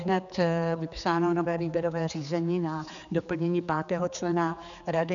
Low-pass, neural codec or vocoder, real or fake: 7.2 kHz; codec, 16 kHz, 4 kbps, X-Codec, HuBERT features, trained on general audio; fake